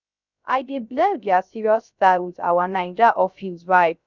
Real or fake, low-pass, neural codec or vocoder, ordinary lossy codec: fake; 7.2 kHz; codec, 16 kHz, 0.3 kbps, FocalCodec; none